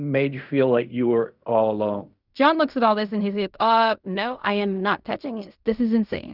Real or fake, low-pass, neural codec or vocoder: fake; 5.4 kHz; codec, 16 kHz in and 24 kHz out, 0.4 kbps, LongCat-Audio-Codec, fine tuned four codebook decoder